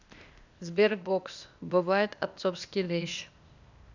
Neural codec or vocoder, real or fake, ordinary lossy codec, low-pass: codec, 16 kHz, 0.8 kbps, ZipCodec; fake; none; 7.2 kHz